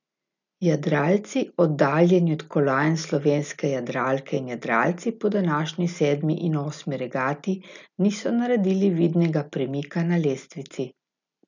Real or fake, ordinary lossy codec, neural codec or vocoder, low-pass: real; none; none; 7.2 kHz